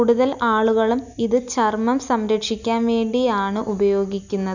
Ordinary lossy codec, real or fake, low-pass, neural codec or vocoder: none; real; 7.2 kHz; none